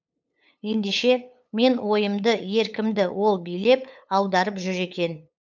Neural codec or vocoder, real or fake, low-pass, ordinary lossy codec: codec, 16 kHz, 8 kbps, FunCodec, trained on LibriTTS, 25 frames a second; fake; 7.2 kHz; none